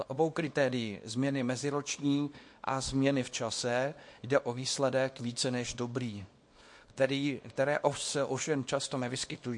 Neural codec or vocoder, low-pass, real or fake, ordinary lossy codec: codec, 24 kHz, 0.9 kbps, WavTokenizer, small release; 10.8 kHz; fake; MP3, 48 kbps